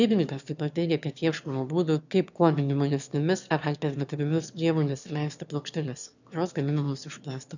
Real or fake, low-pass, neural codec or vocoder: fake; 7.2 kHz; autoencoder, 22.05 kHz, a latent of 192 numbers a frame, VITS, trained on one speaker